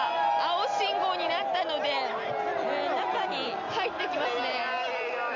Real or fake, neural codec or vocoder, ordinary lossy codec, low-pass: real; none; MP3, 64 kbps; 7.2 kHz